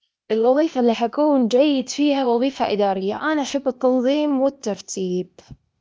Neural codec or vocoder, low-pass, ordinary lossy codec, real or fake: codec, 16 kHz, 0.8 kbps, ZipCodec; none; none; fake